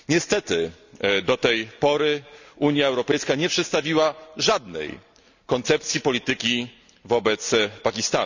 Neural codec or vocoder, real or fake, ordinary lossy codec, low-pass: none; real; none; 7.2 kHz